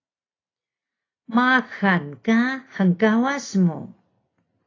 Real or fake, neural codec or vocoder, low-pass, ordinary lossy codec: real; none; 7.2 kHz; AAC, 32 kbps